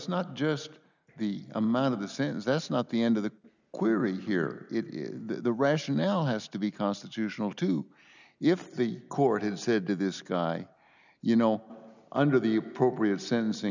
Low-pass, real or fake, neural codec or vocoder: 7.2 kHz; real; none